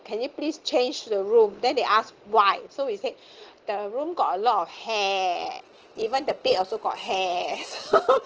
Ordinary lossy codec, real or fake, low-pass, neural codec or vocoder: Opus, 16 kbps; real; 7.2 kHz; none